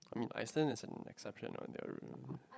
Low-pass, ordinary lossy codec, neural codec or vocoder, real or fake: none; none; codec, 16 kHz, 16 kbps, FreqCodec, larger model; fake